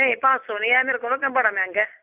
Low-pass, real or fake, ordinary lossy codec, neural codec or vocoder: 3.6 kHz; real; none; none